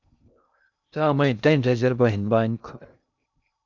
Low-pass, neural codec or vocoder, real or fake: 7.2 kHz; codec, 16 kHz in and 24 kHz out, 0.6 kbps, FocalCodec, streaming, 2048 codes; fake